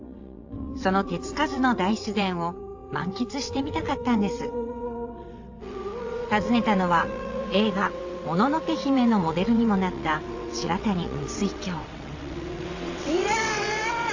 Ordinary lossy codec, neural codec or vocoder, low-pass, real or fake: AAC, 48 kbps; vocoder, 22.05 kHz, 80 mel bands, WaveNeXt; 7.2 kHz; fake